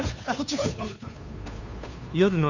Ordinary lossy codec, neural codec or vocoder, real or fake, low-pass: none; codec, 16 kHz, 2 kbps, FunCodec, trained on Chinese and English, 25 frames a second; fake; 7.2 kHz